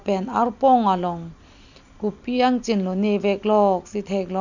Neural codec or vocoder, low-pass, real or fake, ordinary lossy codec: none; 7.2 kHz; real; none